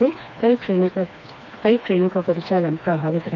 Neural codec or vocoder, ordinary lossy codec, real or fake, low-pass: codec, 16 kHz, 2 kbps, FreqCodec, smaller model; none; fake; 7.2 kHz